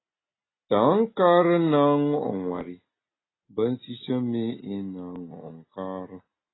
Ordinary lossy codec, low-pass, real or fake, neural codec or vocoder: AAC, 16 kbps; 7.2 kHz; real; none